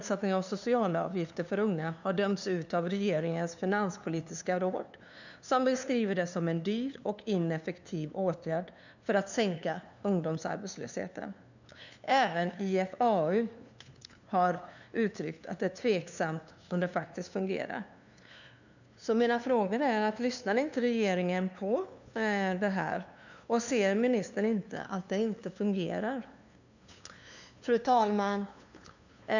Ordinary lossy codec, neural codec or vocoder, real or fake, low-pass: none; codec, 16 kHz, 2 kbps, FunCodec, trained on LibriTTS, 25 frames a second; fake; 7.2 kHz